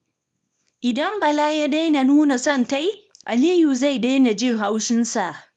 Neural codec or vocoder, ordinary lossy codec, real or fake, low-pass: codec, 24 kHz, 0.9 kbps, WavTokenizer, small release; none; fake; 10.8 kHz